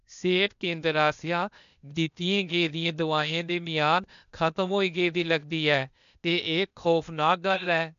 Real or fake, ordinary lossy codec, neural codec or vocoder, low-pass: fake; none; codec, 16 kHz, 0.8 kbps, ZipCodec; 7.2 kHz